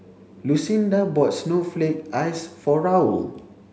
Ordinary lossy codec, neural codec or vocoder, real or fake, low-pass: none; none; real; none